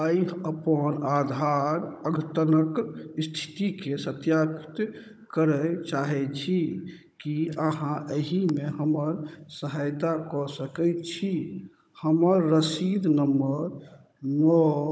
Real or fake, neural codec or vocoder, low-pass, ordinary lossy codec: fake; codec, 16 kHz, 16 kbps, FunCodec, trained on Chinese and English, 50 frames a second; none; none